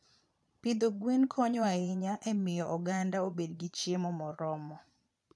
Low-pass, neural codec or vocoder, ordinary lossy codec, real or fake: none; vocoder, 22.05 kHz, 80 mel bands, Vocos; none; fake